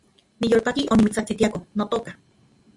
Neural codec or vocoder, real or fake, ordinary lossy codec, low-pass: none; real; MP3, 64 kbps; 10.8 kHz